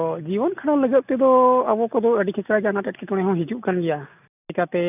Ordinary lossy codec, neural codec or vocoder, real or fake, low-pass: none; none; real; 3.6 kHz